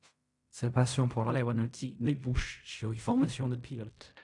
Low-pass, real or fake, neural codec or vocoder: 10.8 kHz; fake; codec, 16 kHz in and 24 kHz out, 0.4 kbps, LongCat-Audio-Codec, fine tuned four codebook decoder